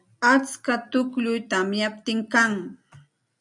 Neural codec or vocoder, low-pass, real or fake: none; 10.8 kHz; real